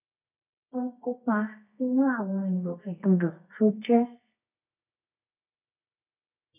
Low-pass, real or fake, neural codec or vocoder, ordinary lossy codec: 3.6 kHz; fake; codec, 24 kHz, 0.9 kbps, WavTokenizer, medium music audio release; none